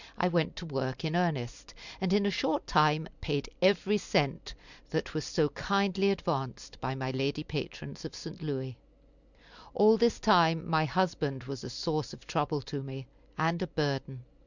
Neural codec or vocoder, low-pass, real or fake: none; 7.2 kHz; real